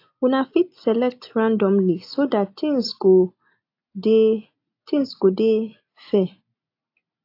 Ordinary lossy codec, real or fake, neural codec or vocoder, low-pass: AAC, 32 kbps; real; none; 5.4 kHz